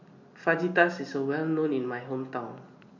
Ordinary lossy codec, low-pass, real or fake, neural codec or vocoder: none; 7.2 kHz; real; none